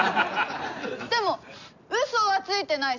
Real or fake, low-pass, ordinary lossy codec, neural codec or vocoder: real; 7.2 kHz; none; none